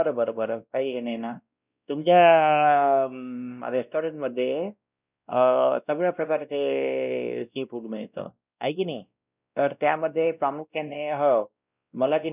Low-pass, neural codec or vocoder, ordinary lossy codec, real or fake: 3.6 kHz; codec, 16 kHz, 1 kbps, X-Codec, WavLM features, trained on Multilingual LibriSpeech; none; fake